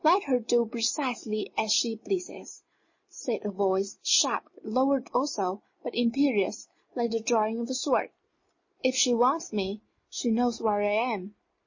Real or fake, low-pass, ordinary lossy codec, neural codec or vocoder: real; 7.2 kHz; MP3, 32 kbps; none